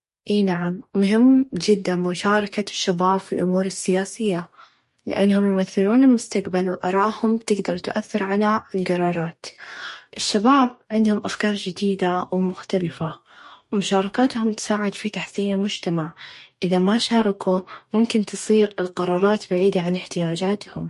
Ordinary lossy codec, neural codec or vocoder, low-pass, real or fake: MP3, 48 kbps; codec, 44.1 kHz, 2.6 kbps, DAC; 14.4 kHz; fake